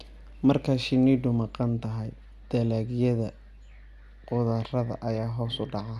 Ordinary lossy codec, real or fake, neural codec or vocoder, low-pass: AAC, 96 kbps; real; none; 14.4 kHz